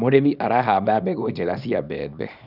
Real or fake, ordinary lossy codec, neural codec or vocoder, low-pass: fake; none; codec, 24 kHz, 0.9 kbps, WavTokenizer, small release; 5.4 kHz